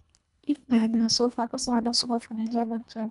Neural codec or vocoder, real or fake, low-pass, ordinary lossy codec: codec, 24 kHz, 1.5 kbps, HILCodec; fake; 10.8 kHz; none